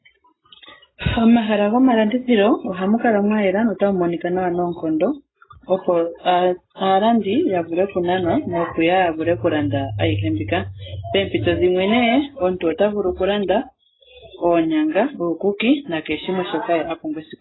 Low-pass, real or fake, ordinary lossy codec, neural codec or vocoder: 7.2 kHz; real; AAC, 16 kbps; none